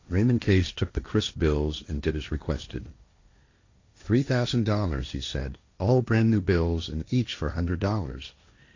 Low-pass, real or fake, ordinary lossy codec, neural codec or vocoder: 7.2 kHz; fake; AAC, 48 kbps; codec, 16 kHz, 1.1 kbps, Voila-Tokenizer